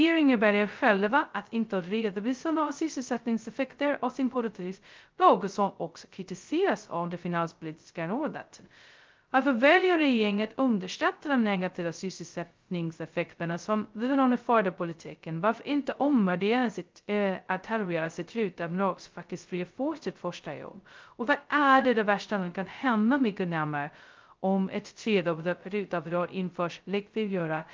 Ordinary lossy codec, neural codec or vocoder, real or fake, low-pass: Opus, 32 kbps; codec, 16 kHz, 0.2 kbps, FocalCodec; fake; 7.2 kHz